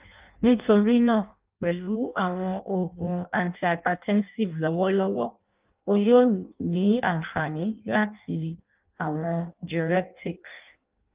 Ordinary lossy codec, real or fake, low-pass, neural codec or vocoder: Opus, 32 kbps; fake; 3.6 kHz; codec, 16 kHz in and 24 kHz out, 0.6 kbps, FireRedTTS-2 codec